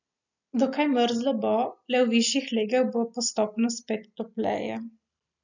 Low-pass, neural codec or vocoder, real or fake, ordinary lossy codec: 7.2 kHz; none; real; none